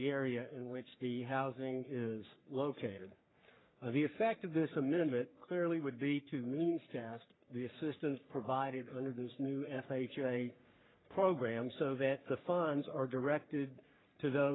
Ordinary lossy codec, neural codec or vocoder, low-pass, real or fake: AAC, 16 kbps; codec, 44.1 kHz, 3.4 kbps, Pupu-Codec; 7.2 kHz; fake